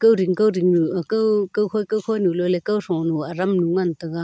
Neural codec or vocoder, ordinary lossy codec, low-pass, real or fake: none; none; none; real